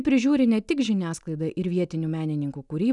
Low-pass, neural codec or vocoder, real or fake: 10.8 kHz; none; real